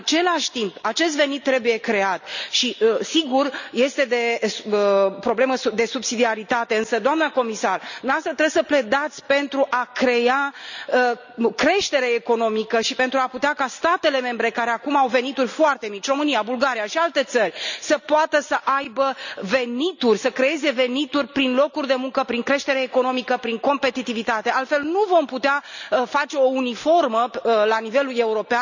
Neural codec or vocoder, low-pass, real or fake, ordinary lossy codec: none; 7.2 kHz; real; none